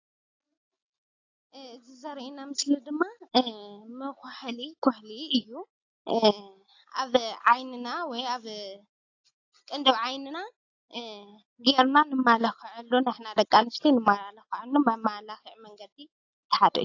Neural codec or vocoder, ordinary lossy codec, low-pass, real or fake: none; AAC, 48 kbps; 7.2 kHz; real